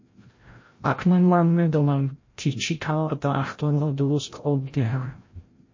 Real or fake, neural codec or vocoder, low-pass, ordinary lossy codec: fake; codec, 16 kHz, 0.5 kbps, FreqCodec, larger model; 7.2 kHz; MP3, 32 kbps